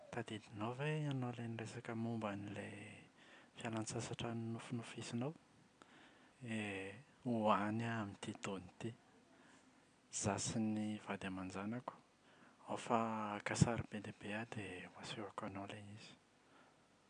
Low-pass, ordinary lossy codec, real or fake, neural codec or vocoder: 9.9 kHz; none; real; none